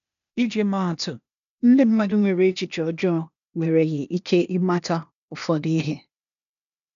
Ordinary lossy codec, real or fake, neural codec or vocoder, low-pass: none; fake; codec, 16 kHz, 0.8 kbps, ZipCodec; 7.2 kHz